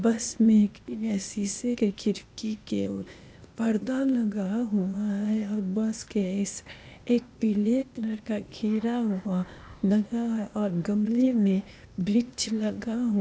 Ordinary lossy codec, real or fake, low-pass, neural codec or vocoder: none; fake; none; codec, 16 kHz, 0.8 kbps, ZipCodec